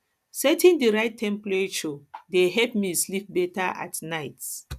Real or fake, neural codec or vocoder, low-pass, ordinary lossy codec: real; none; 14.4 kHz; none